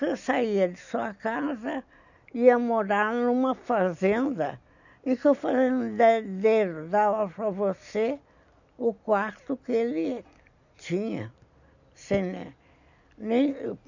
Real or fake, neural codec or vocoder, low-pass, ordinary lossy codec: real; none; 7.2 kHz; none